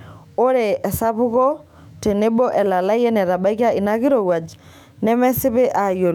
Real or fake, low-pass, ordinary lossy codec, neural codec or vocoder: fake; 19.8 kHz; none; autoencoder, 48 kHz, 128 numbers a frame, DAC-VAE, trained on Japanese speech